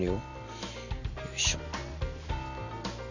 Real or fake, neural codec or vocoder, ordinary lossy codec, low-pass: real; none; none; 7.2 kHz